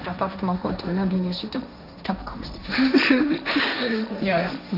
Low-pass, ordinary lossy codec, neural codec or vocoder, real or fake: 5.4 kHz; none; codec, 16 kHz, 1.1 kbps, Voila-Tokenizer; fake